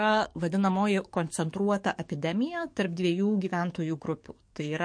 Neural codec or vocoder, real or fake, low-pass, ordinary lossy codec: codec, 44.1 kHz, 7.8 kbps, DAC; fake; 9.9 kHz; MP3, 48 kbps